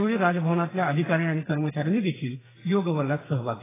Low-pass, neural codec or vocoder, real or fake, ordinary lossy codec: 3.6 kHz; codec, 44.1 kHz, 2.6 kbps, SNAC; fake; AAC, 16 kbps